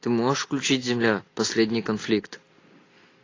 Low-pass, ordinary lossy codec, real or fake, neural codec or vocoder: 7.2 kHz; AAC, 32 kbps; real; none